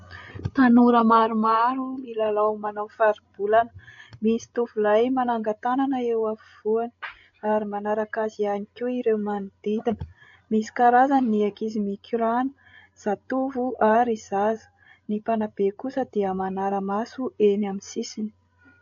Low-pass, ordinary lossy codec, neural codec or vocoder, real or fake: 7.2 kHz; AAC, 48 kbps; codec, 16 kHz, 16 kbps, FreqCodec, larger model; fake